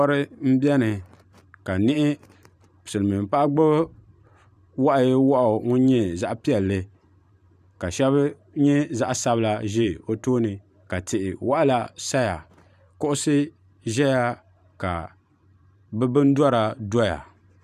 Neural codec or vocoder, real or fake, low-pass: vocoder, 44.1 kHz, 128 mel bands every 512 samples, BigVGAN v2; fake; 14.4 kHz